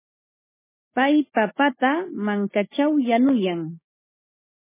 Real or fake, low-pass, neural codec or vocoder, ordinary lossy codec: fake; 3.6 kHz; codec, 16 kHz, 6 kbps, DAC; MP3, 16 kbps